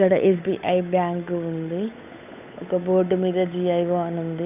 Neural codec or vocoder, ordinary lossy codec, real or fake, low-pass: codec, 16 kHz, 8 kbps, FunCodec, trained on Chinese and English, 25 frames a second; none; fake; 3.6 kHz